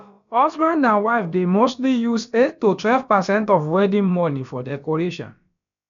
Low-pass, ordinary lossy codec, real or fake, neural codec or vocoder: 7.2 kHz; none; fake; codec, 16 kHz, about 1 kbps, DyCAST, with the encoder's durations